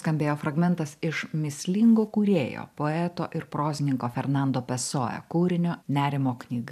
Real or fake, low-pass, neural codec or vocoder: fake; 14.4 kHz; vocoder, 44.1 kHz, 128 mel bands every 512 samples, BigVGAN v2